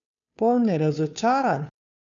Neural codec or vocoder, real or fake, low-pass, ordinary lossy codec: codec, 16 kHz, 2 kbps, FunCodec, trained on Chinese and English, 25 frames a second; fake; 7.2 kHz; none